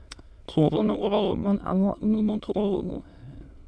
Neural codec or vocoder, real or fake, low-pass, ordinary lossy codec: autoencoder, 22.05 kHz, a latent of 192 numbers a frame, VITS, trained on many speakers; fake; none; none